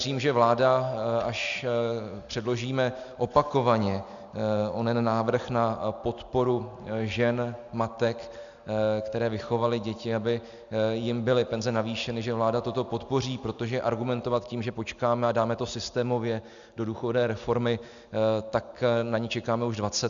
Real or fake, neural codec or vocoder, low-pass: real; none; 7.2 kHz